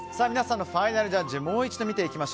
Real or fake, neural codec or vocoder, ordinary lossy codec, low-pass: real; none; none; none